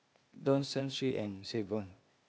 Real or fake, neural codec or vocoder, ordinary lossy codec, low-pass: fake; codec, 16 kHz, 0.8 kbps, ZipCodec; none; none